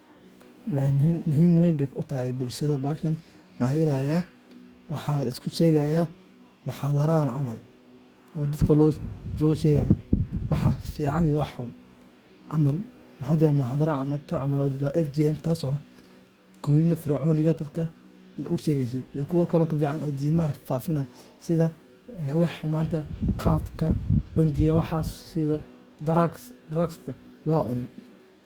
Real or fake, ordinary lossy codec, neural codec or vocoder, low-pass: fake; Opus, 64 kbps; codec, 44.1 kHz, 2.6 kbps, DAC; 19.8 kHz